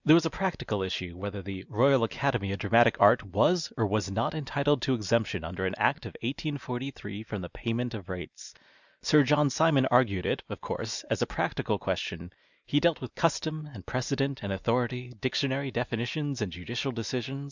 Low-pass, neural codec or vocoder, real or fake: 7.2 kHz; none; real